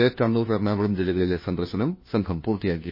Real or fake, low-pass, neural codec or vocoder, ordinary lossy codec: fake; 5.4 kHz; codec, 16 kHz, 1 kbps, FunCodec, trained on LibriTTS, 50 frames a second; MP3, 24 kbps